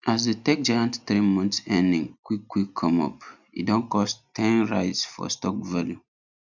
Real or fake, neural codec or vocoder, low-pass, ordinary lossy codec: real; none; 7.2 kHz; none